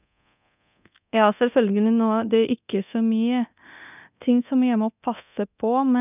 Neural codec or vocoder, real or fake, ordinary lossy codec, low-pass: codec, 24 kHz, 0.9 kbps, DualCodec; fake; none; 3.6 kHz